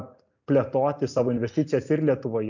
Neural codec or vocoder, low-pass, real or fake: none; 7.2 kHz; real